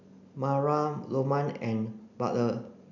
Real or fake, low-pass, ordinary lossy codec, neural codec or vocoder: real; 7.2 kHz; none; none